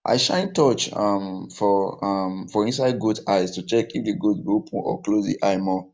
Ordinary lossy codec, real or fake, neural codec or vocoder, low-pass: none; real; none; none